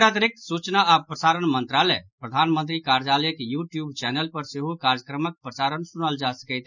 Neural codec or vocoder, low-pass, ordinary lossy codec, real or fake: none; 7.2 kHz; none; real